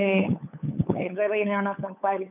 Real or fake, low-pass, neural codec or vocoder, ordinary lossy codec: fake; 3.6 kHz; codec, 16 kHz, 8 kbps, FunCodec, trained on LibriTTS, 25 frames a second; none